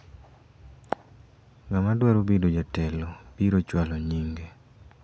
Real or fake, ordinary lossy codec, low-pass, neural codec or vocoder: real; none; none; none